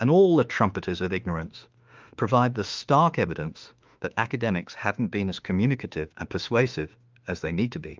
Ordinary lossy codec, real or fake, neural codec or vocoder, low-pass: Opus, 24 kbps; fake; autoencoder, 48 kHz, 32 numbers a frame, DAC-VAE, trained on Japanese speech; 7.2 kHz